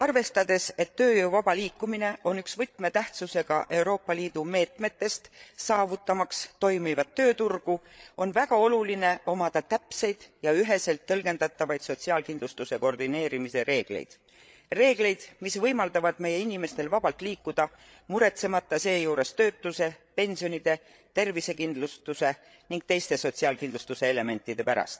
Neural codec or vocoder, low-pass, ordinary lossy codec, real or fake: codec, 16 kHz, 8 kbps, FreqCodec, larger model; none; none; fake